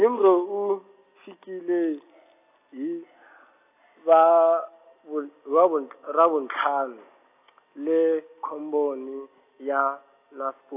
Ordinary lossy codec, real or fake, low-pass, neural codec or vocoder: AAC, 24 kbps; real; 3.6 kHz; none